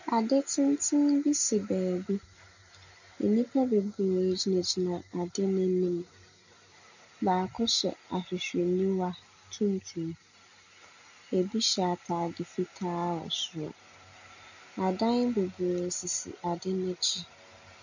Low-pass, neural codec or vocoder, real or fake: 7.2 kHz; none; real